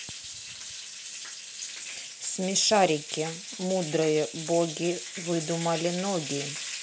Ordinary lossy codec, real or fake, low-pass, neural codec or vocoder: none; real; none; none